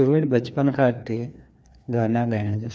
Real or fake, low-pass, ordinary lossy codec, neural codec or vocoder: fake; none; none; codec, 16 kHz, 2 kbps, FreqCodec, larger model